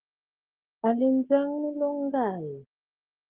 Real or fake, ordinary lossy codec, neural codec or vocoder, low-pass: real; Opus, 16 kbps; none; 3.6 kHz